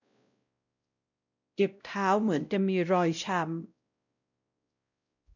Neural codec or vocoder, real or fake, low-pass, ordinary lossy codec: codec, 16 kHz, 0.5 kbps, X-Codec, WavLM features, trained on Multilingual LibriSpeech; fake; 7.2 kHz; none